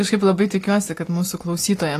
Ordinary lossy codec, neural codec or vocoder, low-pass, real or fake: AAC, 48 kbps; none; 14.4 kHz; real